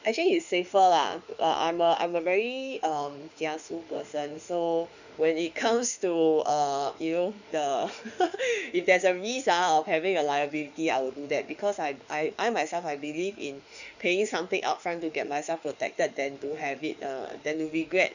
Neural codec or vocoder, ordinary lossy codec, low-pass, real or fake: autoencoder, 48 kHz, 32 numbers a frame, DAC-VAE, trained on Japanese speech; none; 7.2 kHz; fake